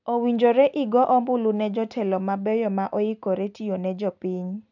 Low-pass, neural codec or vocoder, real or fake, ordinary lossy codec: 7.2 kHz; none; real; none